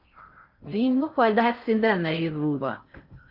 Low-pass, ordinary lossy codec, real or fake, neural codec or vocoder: 5.4 kHz; Opus, 24 kbps; fake; codec, 16 kHz in and 24 kHz out, 0.6 kbps, FocalCodec, streaming, 4096 codes